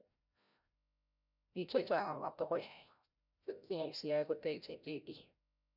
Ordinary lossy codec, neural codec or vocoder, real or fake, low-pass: none; codec, 16 kHz, 0.5 kbps, FreqCodec, larger model; fake; 5.4 kHz